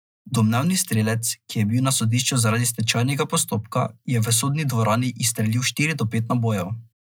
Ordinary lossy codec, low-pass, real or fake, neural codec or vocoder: none; none; real; none